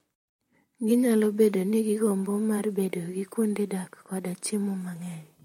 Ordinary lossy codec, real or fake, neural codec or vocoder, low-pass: MP3, 64 kbps; fake; vocoder, 44.1 kHz, 128 mel bands, Pupu-Vocoder; 19.8 kHz